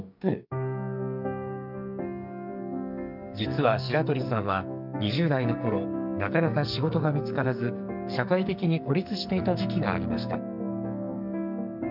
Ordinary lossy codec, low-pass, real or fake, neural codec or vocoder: none; 5.4 kHz; fake; codec, 44.1 kHz, 2.6 kbps, SNAC